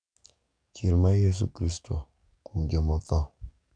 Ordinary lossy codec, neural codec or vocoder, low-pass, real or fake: none; codec, 44.1 kHz, 7.8 kbps, DAC; 9.9 kHz; fake